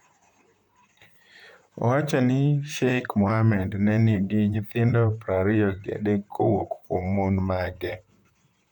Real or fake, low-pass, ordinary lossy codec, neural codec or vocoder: fake; 19.8 kHz; none; vocoder, 44.1 kHz, 128 mel bands, Pupu-Vocoder